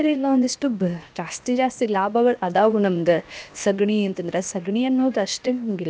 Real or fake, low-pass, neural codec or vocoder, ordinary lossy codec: fake; none; codec, 16 kHz, 0.7 kbps, FocalCodec; none